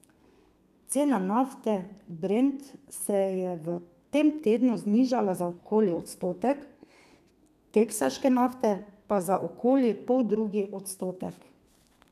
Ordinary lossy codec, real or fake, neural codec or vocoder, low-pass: none; fake; codec, 32 kHz, 1.9 kbps, SNAC; 14.4 kHz